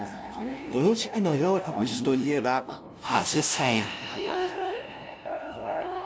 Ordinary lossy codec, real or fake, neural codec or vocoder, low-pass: none; fake; codec, 16 kHz, 0.5 kbps, FunCodec, trained on LibriTTS, 25 frames a second; none